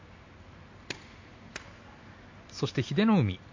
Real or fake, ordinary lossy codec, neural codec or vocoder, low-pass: real; MP3, 48 kbps; none; 7.2 kHz